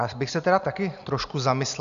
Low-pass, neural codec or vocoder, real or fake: 7.2 kHz; none; real